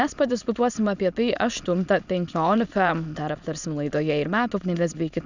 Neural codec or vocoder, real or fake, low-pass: autoencoder, 22.05 kHz, a latent of 192 numbers a frame, VITS, trained on many speakers; fake; 7.2 kHz